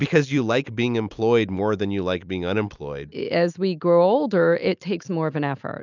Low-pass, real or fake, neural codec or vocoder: 7.2 kHz; real; none